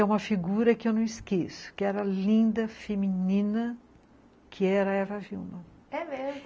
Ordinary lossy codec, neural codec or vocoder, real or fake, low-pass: none; none; real; none